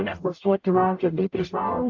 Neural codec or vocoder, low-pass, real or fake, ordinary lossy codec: codec, 44.1 kHz, 0.9 kbps, DAC; 7.2 kHz; fake; AAC, 48 kbps